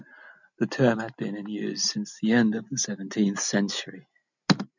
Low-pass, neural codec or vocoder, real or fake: 7.2 kHz; none; real